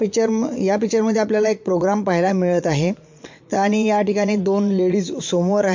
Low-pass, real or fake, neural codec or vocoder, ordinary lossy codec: 7.2 kHz; fake; vocoder, 22.05 kHz, 80 mel bands, Vocos; MP3, 48 kbps